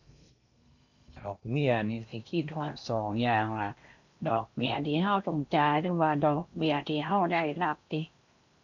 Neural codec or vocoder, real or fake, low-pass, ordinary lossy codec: codec, 16 kHz in and 24 kHz out, 0.8 kbps, FocalCodec, streaming, 65536 codes; fake; 7.2 kHz; none